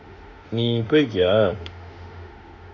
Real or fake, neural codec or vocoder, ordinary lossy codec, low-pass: fake; autoencoder, 48 kHz, 32 numbers a frame, DAC-VAE, trained on Japanese speech; none; 7.2 kHz